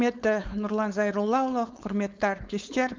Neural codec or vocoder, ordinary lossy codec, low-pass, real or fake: codec, 16 kHz, 4.8 kbps, FACodec; Opus, 32 kbps; 7.2 kHz; fake